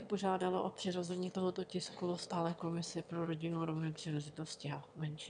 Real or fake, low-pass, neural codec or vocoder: fake; 9.9 kHz; autoencoder, 22.05 kHz, a latent of 192 numbers a frame, VITS, trained on one speaker